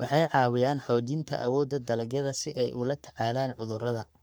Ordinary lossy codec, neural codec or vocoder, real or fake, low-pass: none; codec, 44.1 kHz, 3.4 kbps, Pupu-Codec; fake; none